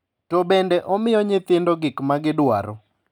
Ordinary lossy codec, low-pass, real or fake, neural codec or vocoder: none; 19.8 kHz; real; none